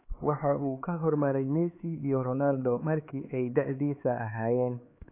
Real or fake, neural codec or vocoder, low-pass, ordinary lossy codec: fake; codec, 16 kHz, 4 kbps, X-Codec, HuBERT features, trained on LibriSpeech; 3.6 kHz; AAC, 24 kbps